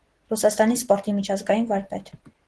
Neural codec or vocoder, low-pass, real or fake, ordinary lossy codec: none; 10.8 kHz; real; Opus, 16 kbps